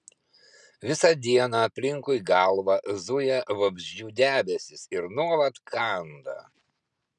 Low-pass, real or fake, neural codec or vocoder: 10.8 kHz; real; none